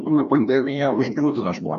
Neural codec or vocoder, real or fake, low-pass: codec, 16 kHz, 1 kbps, FreqCodec, larger model; fake; 7.2 kHz